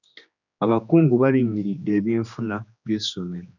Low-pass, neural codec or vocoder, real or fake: 7.2 kHz; codec, 16 kHz, 2 kbps, X-Codec, HuBERT features, trained on general audio; fake